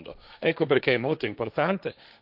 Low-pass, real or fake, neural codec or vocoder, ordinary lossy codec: 5.4 kHz; fake; codec, 16 kHz, 1.1 kbps, Voila-Tokenizer; none